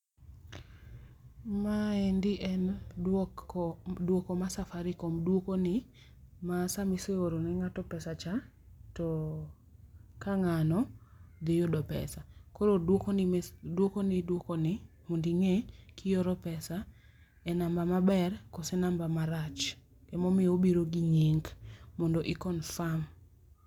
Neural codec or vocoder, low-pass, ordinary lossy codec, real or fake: none; 19.8 kHz; none; real